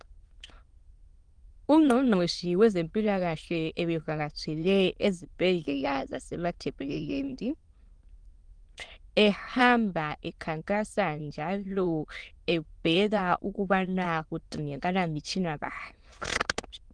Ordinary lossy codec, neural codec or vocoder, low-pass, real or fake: Opus, 24 kbps; autoencoder, 22.05 kHz, a latent of 192 numbers a frame, VITS, trained on many speakers; 9.9 kHz; fake